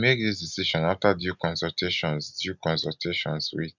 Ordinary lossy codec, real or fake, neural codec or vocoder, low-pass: none; real; none; 7.2 kHz